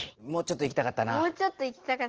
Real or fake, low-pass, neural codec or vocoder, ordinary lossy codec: real; 7.2 kHz; none; Opus, 16 kbps